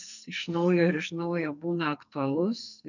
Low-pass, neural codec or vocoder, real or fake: 7.2 kHz; codec, 44.1 kHz, 2.6 kbps, SNAC; fake